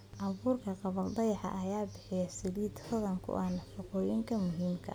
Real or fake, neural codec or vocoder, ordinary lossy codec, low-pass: real; none; none; none